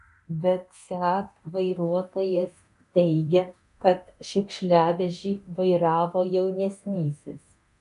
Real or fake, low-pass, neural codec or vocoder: fake; 10.8 kHz; codec, 24 kHz, 0.9 kbps, DualCodec